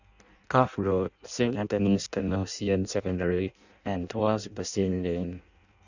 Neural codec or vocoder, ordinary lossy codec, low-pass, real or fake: codec, 16 kHz in and 24 kHz out, 0.6 kbps, FireRedTTS-2 codec; none; 7.2 kHz; fake